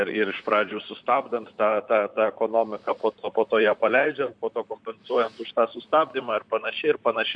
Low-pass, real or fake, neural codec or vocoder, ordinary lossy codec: 9.9 kHz; fake; vocoder, 44.1 kHz, 128 mel bands, Pupu-Vocoder; MP3, 64 kbps